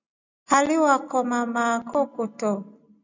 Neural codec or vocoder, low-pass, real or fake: none; 7.2 kHz; real